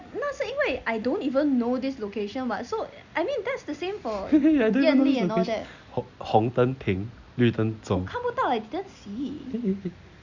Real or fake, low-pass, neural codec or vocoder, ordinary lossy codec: real; 7.2 kHz; none; Opus, 64 kbps